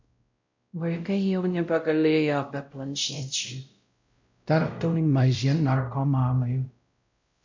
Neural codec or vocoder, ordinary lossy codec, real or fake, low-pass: codec, 16 kHz, 0.5 kbps, X-Codec, WavLM features, trained on Multilingual LibriSpeech; MP3, 64 kbps; fake; 7.2 kHz